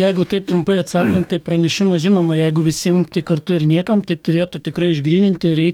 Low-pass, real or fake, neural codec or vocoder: 19.8 kHz; fake; codec, 44.1 kHz, 2.6 kbps, DAC